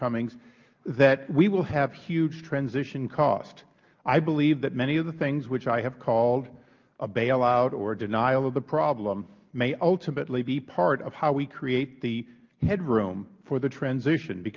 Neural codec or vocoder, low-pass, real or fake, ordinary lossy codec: none; 7.2 kHz; real; Opus, 32 kbps